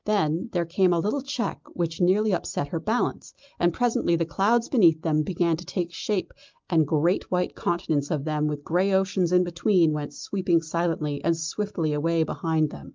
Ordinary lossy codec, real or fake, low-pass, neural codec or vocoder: Opus, 24 kbps; real; 7.2 kHz; none